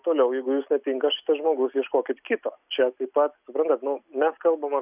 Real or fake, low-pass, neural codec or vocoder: real; 3.6 kHz; none